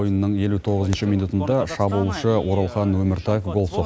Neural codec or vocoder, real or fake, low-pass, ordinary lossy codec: none; real; none; none